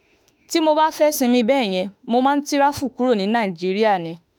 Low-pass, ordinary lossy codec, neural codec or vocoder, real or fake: none; none; autoencoder, 48 kHz, 32 numbers a frame, DAC-VAE, trained on Japanese speech; fake